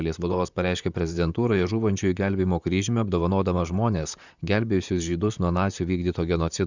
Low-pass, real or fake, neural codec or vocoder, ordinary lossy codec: 7.2 kHz; fake; vocoder, 44.1 kHz, 128 mel bands, Pupu-Vocoder; Opus, 64 kbps